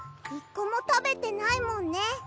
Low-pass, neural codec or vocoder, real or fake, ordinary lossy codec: none; none; real; none